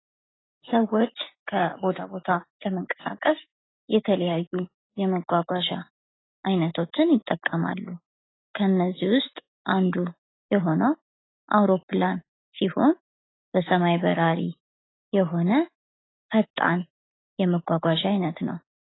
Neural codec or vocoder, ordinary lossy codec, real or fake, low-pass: none; AAC, 16 kbps; real; 7.2 kHz